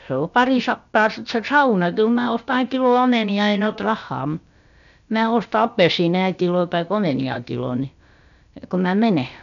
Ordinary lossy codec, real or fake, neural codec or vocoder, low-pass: none; fake; codec, 16 kHz, about 1 kbps, DyCAST, with the encoder's durations; 7.2 kHz